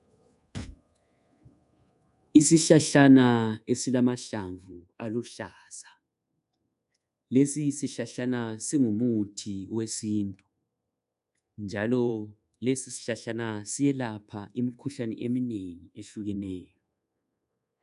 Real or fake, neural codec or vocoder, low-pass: fake; codec, 24 kHz, 1.2 kbps, DualCodec; 10.8 kHz